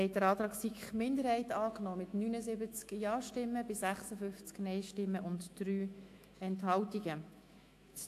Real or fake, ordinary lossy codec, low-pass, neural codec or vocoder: fake; AAC, 64 kbps; 14.4 kHz; autoencoder, 48 kHz, 128 numbers a frame, DAC-VAE, trained on Japanese speech